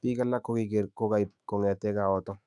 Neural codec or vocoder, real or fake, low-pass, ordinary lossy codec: autoencoder, 48 kHz, 128 numbers a frame, DAC-VAE, trained on Japanese speech; fake; 10.8 kHz; none